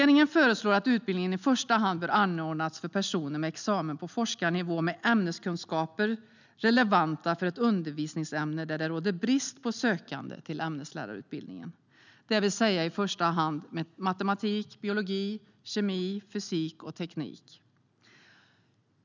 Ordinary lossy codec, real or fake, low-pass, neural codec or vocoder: none; real; 7.2 kHz; none